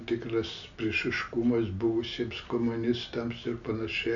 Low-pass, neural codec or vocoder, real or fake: 7.2 kHz; none; real